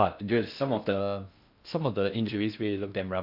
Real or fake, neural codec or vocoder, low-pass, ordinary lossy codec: fake; codec, 16 kHz in and 24 kHz out, 0.6 kbps, FocalCodec, streaming, 4096 codes; 5.4 kHz; MP3, 48 kbps